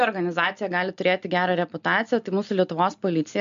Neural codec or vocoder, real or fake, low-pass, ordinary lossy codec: none; real; 7.2 kHz; MP3, 64 kbps